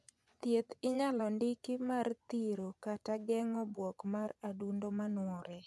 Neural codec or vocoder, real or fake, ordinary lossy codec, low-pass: vocoder, 24 kHz, 100 mel bands, Vocos; fake; none; none